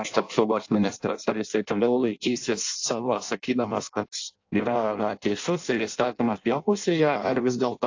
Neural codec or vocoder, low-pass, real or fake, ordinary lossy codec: codec, 16 kHz in and 24 kHz out, 0.6 kbps, FireRedTTS-2 codec; 7.2 kHz; fake; AAC, 48 kbps